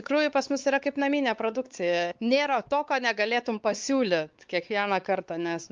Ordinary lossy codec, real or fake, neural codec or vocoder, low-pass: Opus, 32 kbps; fake; codec, 16 kHz, 4 kbps, X-Codec, WavLM features, trained on Multilingual LibriSpeech; 7.2 kHz